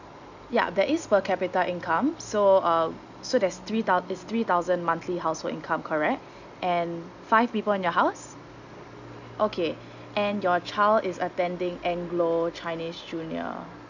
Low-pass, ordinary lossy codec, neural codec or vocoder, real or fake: 7.2 kHz; none; none; real